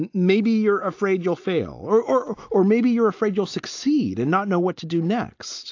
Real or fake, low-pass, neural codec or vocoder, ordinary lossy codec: real; 7.2 kHz; none; AAC, 48 kbps